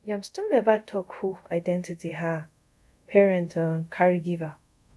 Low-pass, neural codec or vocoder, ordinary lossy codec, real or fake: none; codec, 24 kHz, 0.5 kbps, DualCodec; none; fake